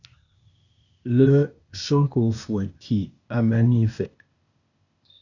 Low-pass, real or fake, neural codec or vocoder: 7.2 kHz; fake; codec, 16 kHz, 0.8 kbps, ZipCodec